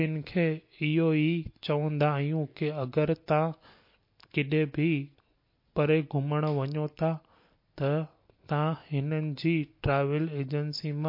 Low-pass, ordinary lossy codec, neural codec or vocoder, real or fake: 5.4 kHz; MP3, 32 kbps; none; real